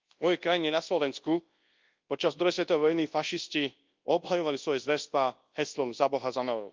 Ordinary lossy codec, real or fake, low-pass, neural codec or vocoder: Opus, 32 kbps; fake; 7.2 kHz; codec, 24 kHz, 0.9 kbps, WavTokenizer, large speech release